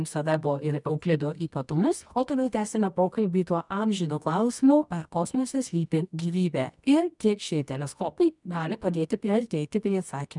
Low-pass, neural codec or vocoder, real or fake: 10.8 kHz; codec, 24 kHz, 0.9 kbps, WavTokenizer, medium music audio release; fake